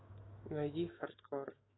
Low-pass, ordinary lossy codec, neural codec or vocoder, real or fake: 7.2 kHz; AAC, 16 kbps; autoencoder, 48 kHz, 128 numbers a frame, DAC-VAE, trained on Japanese speech; fake